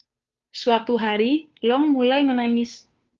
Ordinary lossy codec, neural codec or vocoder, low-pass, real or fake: Opus, 32 kbps; codec, 16 kHz, 2 kbps, FunCodec, trained on Chinese and English, 25 frames a second; 7.2 kHz; fake